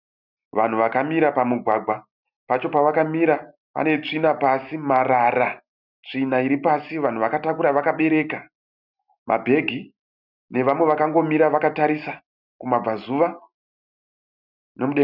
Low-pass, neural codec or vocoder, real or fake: 5.4 kHz; none; real